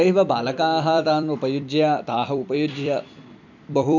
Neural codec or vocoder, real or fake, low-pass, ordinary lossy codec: vocoder, 44.1 kHz, 128 mel bands every 256 samples, BigVGAN v2; fake; 7.2 kHz; none